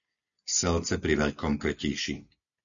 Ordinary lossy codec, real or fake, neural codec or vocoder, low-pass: MP3, 96 kbps; real; none; 7.2 kHz